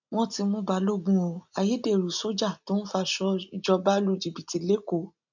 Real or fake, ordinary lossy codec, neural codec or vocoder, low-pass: real; none; none; 7.2 kHz